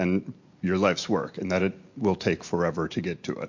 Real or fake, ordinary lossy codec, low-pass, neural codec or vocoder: real; MP3, 48 kbps; 7.2 kHz; none